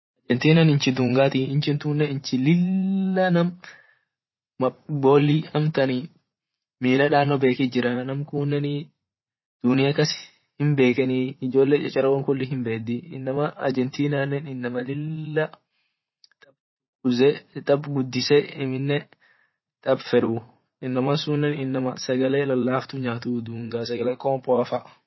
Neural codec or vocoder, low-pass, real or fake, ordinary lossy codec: vocoder, 44.1 kHz, 80 mel bands, Vocos; 7.2 kHz; fake; MP3, 24 kbps